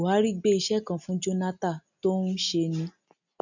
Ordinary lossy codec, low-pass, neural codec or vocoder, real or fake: none; 7.2 kHz; none; real